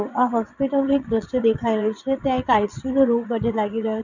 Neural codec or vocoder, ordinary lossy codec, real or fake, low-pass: vocoder, 22.05 kHz, 80 mel bands, WaveNeXt; none; fake; 7.2 kHz